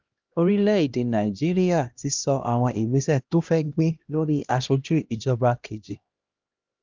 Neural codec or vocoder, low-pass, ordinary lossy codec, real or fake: codec, 16 kHz, 1 kbps, X-Codec, HuBERT features, trained on LibriSpeech; 7.2 kHz; Opus, 24 kbps; fake